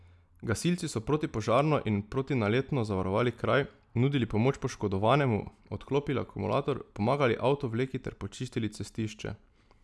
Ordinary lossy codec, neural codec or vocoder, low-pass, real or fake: none; none; none; real